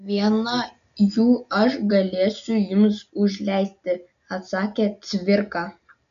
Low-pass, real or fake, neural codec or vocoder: 7.2 kHz; real; none